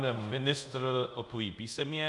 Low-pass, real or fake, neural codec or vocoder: 10.8 kHz; fake; codec, 24 kHz, 0.5 kbps, DualCodec